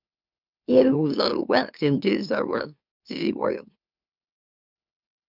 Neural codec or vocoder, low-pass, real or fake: autoencoder, 44.1 kHz, a latent of 192 numbers a frame, MeloTTS; 5.4 kHz; fake